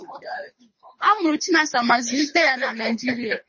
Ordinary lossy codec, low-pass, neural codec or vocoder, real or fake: MP3, 32 kbps; 7.2 kHz; codec, 24 kHz, 3 kbps, HILCodec; fake